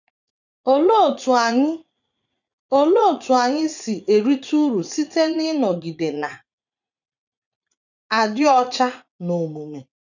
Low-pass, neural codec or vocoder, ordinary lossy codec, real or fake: 7.2 kHz; vocoder, 44.1 kHz, 80 mel bands, Vocos; none; fake